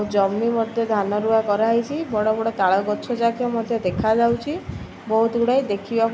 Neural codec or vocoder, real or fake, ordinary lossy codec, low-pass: none; real; none; none